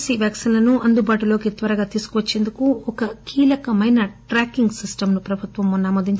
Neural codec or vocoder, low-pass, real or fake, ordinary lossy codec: none; none; real; none